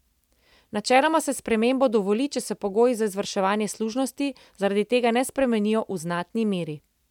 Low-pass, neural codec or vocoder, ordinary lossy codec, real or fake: 19.8 kHz; none; none; real